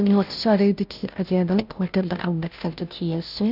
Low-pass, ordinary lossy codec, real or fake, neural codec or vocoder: 5.4 kHz; none; fake; codec, 16 kHz, 0.5 kbps, FunCodec, trained on Chinese and English, 25 frames a second